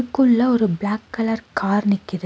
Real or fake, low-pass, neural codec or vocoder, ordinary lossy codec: real; none; none; none